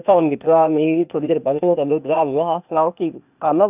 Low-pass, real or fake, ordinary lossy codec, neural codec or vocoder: 3.6 kHz; fake; none; codec, 16 kHz, 0.8 kbps, ZipCodec